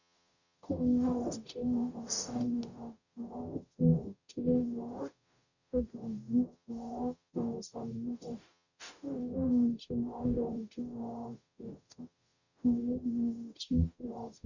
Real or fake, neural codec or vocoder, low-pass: fake; codec, 44.1 kHz, 0.9 kbps, DAC; 7.2 kHz